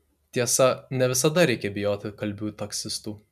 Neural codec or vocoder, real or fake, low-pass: none; real; 14.4 kHz